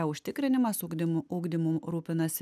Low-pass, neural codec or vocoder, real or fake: 14.4 kHz; none; real